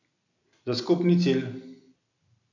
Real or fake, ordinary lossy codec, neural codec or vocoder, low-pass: real; none; none; 7.2 kHz